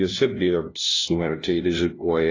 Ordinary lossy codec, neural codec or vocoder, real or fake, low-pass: AAC, 32 kbps; codec, 16 kHz, 0.5 kbps, FunCodec, trained on LibriTTS, 25 frames a second; fake; 7.2 kHz